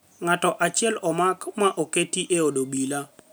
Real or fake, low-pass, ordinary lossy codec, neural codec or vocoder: real; none; none; none